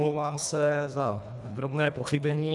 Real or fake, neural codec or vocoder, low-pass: fake; codec, 24 kHz, 1.5 kbps, HILCodec; 10.8 kHz